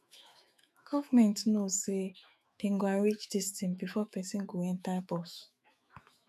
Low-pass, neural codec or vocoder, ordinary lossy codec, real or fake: 14.4 kHz; autoencoder, 48 kHz, 128 numbers a frame, DAC-VAE, trained on Japanese speech; none; fake